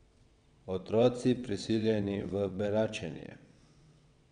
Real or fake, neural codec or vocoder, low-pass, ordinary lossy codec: fake; vocoder, 22.05 kHz, 80 mel bands, WaveNeXt; 9.9 kHz; none